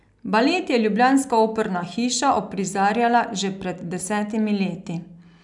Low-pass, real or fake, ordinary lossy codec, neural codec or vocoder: 10.8 kHz; real; none; none